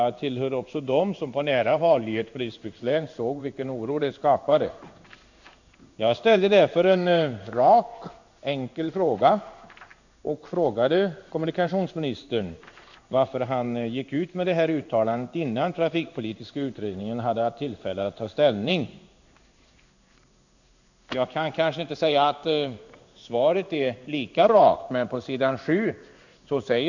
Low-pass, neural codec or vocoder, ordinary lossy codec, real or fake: 7.2 kHz; codec, 16 kHz in and 24 kHz out, 1 kbps, XY-Tokenizer; none; fake